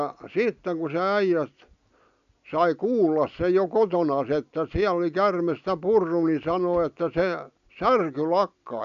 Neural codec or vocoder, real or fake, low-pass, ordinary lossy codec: none; real; 7.2 kHz; none